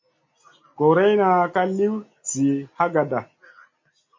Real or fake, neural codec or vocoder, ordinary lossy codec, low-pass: real; none; MP3, 32 kbps; 7.2 kHz